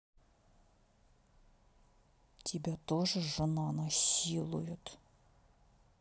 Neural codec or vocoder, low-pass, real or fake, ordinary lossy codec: none; none; real; none